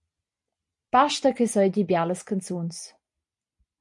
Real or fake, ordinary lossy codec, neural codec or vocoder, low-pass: real; MP3, 64 kbps; none; 10.8 kHz